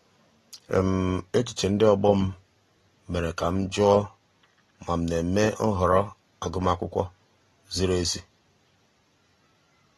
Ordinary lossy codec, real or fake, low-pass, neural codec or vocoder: AAC, 32 kbps; real; 14.4 kHz; none